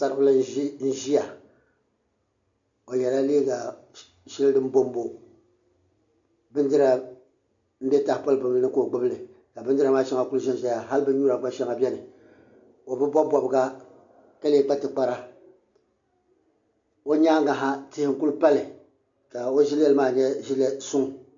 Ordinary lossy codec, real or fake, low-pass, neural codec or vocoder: MP3, 64 kbps; real; 7.2 kHz; none